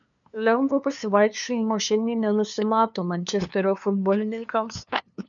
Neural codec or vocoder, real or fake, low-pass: codec, 16 kHz, 2 kbps, FunCodec, trained on LibriTTS, 25 frames a second; fake; 7.2 kHz